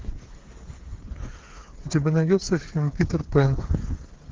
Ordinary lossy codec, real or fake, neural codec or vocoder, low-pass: Opus, 16 kbps; fake; codec, 16 kHz, 8 kbps, FreqCodec, smaller model; 7.2 kHz